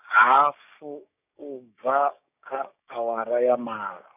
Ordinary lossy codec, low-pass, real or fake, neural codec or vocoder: none; 3.6 kHz; fake; codec, 16 kHz, 6 kbps, DAC